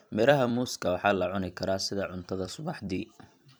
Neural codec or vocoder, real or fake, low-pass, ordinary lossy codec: vocoder, 44.1 kHz, 128 mel bands every 256 samples, BigVGAN v2; fake; none; none